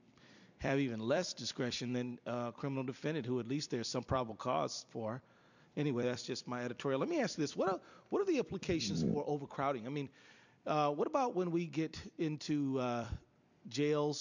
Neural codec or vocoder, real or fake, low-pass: vocoder, 44.1 kHz, 128 mel bands every 256 samples, BigVGAN v2; fake; 7.2 kHz